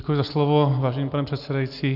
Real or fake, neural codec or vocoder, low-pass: real; none; 5.4 kHz